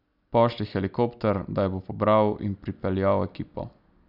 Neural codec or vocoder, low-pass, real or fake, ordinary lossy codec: none; 5.4 kHz; real; none